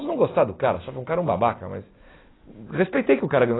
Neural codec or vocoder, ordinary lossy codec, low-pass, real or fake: none; AAC, 16 kbps; 7.2 kHz; real